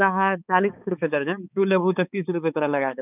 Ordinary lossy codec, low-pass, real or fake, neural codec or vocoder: none; 3.6 kHz; fake; codec, 16 kHz, 4 kbps, X-Codec, HuBERT features, trained on balanced general audio